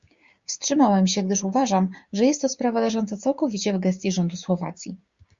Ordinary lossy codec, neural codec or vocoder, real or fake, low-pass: Opus, 64 kbps; codec, 16 kHz, 6 kbps, DAC; fake; 7.2 kHz